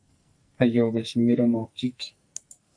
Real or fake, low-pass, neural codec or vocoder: fake; 9.9 kHz; codec, 44.1 kHz, 2.6 kbps, SNAC